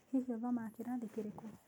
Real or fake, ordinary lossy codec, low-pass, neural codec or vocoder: real; none; none; none